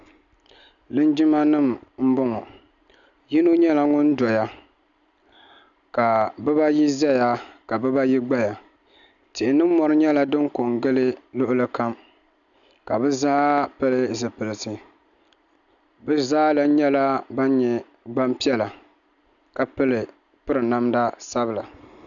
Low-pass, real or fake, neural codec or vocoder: 7.2 kHz; real; none